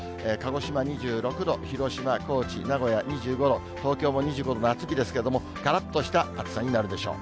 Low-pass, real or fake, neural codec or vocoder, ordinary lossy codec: none; real; none; none